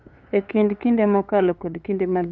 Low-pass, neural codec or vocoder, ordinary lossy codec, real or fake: none; codec, 16 kHz, 2 kbps, FunCodec, trained on LibriTTS, 25 frames a second; none; fake